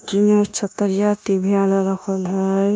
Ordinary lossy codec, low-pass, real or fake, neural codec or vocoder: none; none; fake; codec, 16 kHz, 0.9 kbps, LongCat-Audio-Codec